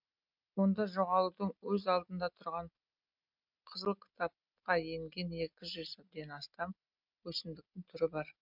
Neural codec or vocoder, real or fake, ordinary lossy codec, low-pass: none; real; MP3, 48 kbps; 5.4 kHz